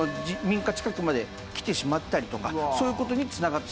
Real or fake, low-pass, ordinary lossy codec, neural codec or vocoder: real; none; none; none